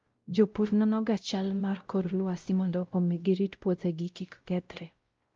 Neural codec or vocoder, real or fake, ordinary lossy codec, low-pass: codec, 16 kHz, 0.5 kbps, X-Codec, WavLM features, trained on Multilingual LibriSpeech; fake; Opus, 32 kbps; 7.2 kHz